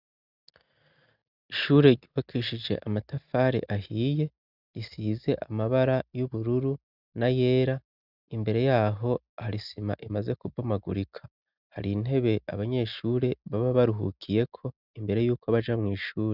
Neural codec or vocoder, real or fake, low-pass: none; real; 5.4 kHz